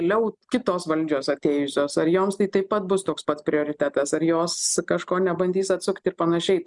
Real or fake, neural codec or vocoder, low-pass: real; none; 10.8 kHz